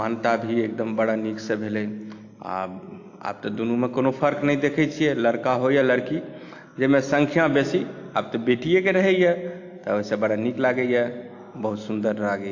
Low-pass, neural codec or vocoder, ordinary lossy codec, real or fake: 7.2 kHz; none; AAC, 48 kbps; real